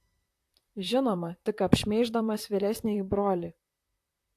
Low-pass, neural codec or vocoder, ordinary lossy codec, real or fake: 14.4 kHz; vocoder, 44.1 kHz, 128 mel bands, Pupu-Vocoder; AAC, 64 kbps; fake